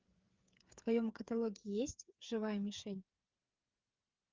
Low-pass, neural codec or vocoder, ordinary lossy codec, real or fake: 7.2 kHz; codec, 16 kHz, 8 kbps, FreqCodec, smaller model; Opus, 24 kbps; fake